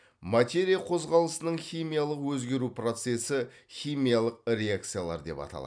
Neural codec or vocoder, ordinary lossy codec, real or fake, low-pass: none; none; real; 9.9 kHz